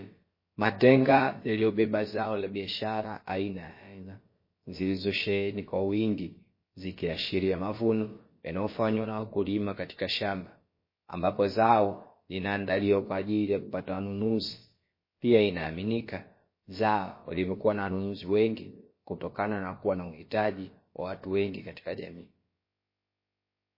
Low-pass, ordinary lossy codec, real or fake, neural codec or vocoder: 5.4 kHz; MP3, 24 kbps; fake; codec, 16 kHz, about 1 kbps, DyCAST, with the encoder's durations